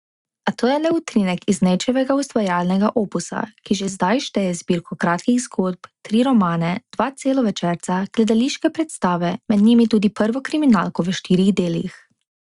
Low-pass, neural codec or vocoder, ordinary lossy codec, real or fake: 10.8 kHz; none; Opus, 64 kbps; real